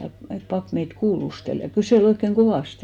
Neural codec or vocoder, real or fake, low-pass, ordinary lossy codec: none; real; 19.8 kHz; none